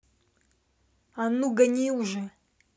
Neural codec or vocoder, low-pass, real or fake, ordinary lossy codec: none; none; real; none